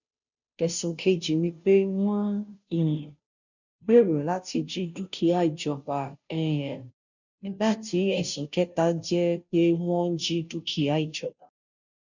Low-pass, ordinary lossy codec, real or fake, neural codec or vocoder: 7.2 kHz; none; fake; codec, 16 kHz, 0.5 kbps, FunCodec, trained on Chinese and English, 25 frames a second